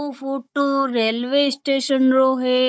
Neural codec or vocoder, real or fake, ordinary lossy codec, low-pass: codec, 16 kHz, 16 kbps, FunCodec, trained on Chinese and English, 50 frames a second; fake; none; none